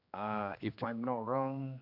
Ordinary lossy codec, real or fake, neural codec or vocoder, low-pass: MP3, 48 kbps; fake; codec, 16 kHz, 2 kbps, X-Codec, HuBERT features, trained on general audio; 5.4 kHz